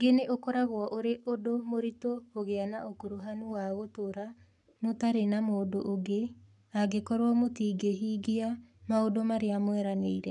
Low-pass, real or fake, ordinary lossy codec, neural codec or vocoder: 10.8 kHz; fake; none; codec, 44.1 kHz, 7.8 kbps, Pupu-Codec